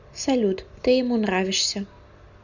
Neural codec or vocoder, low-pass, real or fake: none; 7.2 kHz; real